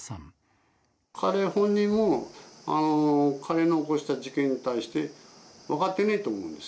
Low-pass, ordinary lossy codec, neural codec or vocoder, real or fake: none; none; none; real